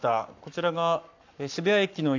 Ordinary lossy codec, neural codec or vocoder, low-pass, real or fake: AAC, 48 kbps; codec, 44.1 kHz, 7.8 kbps, Pupu-Codec; 7.2 kHz; fake